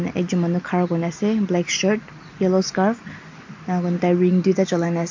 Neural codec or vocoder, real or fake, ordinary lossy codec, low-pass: none; real; MP3, 48 kbps; 7.2 kHz